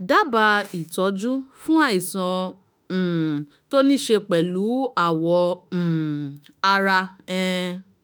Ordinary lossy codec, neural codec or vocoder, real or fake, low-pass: none; autoencoder, 48 kHz, 32 numbers a frame, DAC-VAE, trained on Japanese speech; fake; none